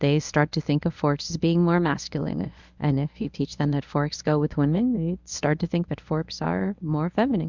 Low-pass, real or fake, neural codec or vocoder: 7.2 kHz; fake; codec, 24 kHz, 0.9 kbps, WavTokenizer, medium speech release version 1